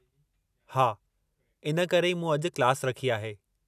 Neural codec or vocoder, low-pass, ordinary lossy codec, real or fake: none; 14.4 kHz; none; real